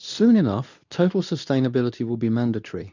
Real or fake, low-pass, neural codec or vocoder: fake; 7.2 kHz; codec, 24 kHz, 0.9 kbps, WavTokenizer, medium speech release version 2